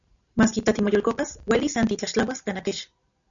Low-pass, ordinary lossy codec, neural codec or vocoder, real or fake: 7.2 kHz; MP3, 96 kbps; none; real